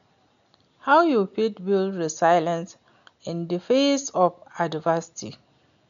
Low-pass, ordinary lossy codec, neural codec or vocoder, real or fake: 7.2 kHz; none; none; real